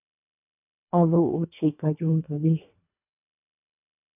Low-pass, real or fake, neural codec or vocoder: 3.6 kHz; fake; codec, 24 kHz, 1.5 kbps, HILCodec